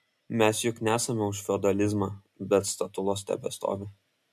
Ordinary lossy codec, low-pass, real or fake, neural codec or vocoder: MP3, 64 kbps; 14.4 kHz; real; none